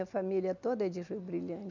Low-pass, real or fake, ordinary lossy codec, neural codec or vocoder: 7.2 kHz; real; none; none